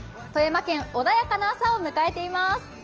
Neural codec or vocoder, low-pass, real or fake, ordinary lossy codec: none; 7.2 kHz; real; Opus, 24 kbps